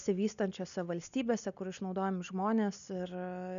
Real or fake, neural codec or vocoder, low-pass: real; none; 7.2 kHz